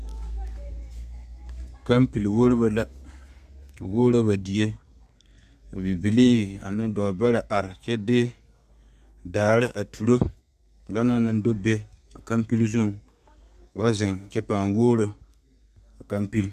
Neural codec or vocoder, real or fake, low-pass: codec, 32 kHz, 1.9 kbps, SNAC; fake; 14.4 kHz